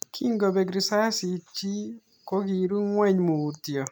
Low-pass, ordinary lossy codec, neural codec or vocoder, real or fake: none; none; none; real